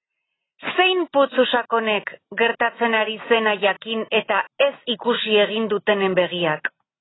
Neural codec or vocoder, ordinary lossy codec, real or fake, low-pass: none; AAC, 16 kbps; real; 7.2 kHz